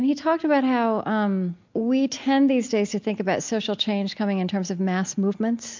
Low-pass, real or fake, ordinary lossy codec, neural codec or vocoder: 7.2 kHz; real; MP3, 64 kbps; none